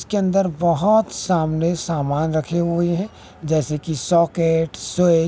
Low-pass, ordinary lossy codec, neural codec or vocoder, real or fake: none; none; none; real